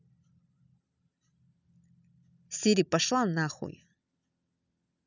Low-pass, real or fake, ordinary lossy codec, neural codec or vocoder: 7.2 kHz; real; none; none